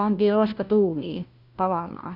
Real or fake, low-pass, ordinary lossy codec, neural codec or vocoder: fake; 5.4 kHz; Opus, 64 kbps; codec, 16 kHz, 1 kbps, FunCodec, trained on LibriTTS, 50 frames a second